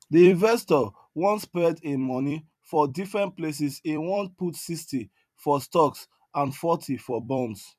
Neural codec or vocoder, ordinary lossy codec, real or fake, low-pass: vocoder, 44.1 kHz, 128 mel bands every 256 samples, BigVGAN v2; none; fake; 14.4 kHz